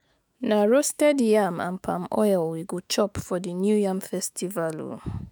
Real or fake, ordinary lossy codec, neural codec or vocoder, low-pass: fake; none; autoencoder, 48 kHz, 128 numbers a frame, DAC-VAE, trained on Japanese speech; none